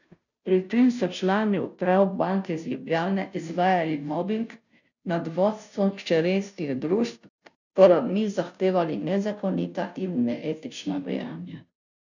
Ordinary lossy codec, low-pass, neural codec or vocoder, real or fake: none; 7.2 kHz; codec, 16 kHz, 0.5 kbps, FunCodec, trained on Chinese and English, 25 frames a second; fake